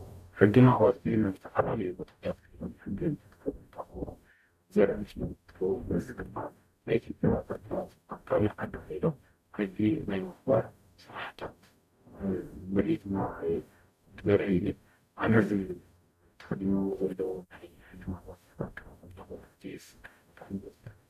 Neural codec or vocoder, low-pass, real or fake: codec, 44.1 kHz, 0.9 kbps, DAC; 14.4 kHz; fake